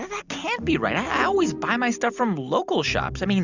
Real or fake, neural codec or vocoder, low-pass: real; none; 7.2 kHz